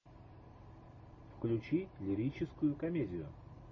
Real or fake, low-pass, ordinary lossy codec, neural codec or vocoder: real; 7.2 kHz; MP3, 32 kbps; none